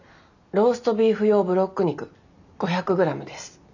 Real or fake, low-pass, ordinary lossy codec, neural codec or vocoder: real; 7.2 kHz; none; none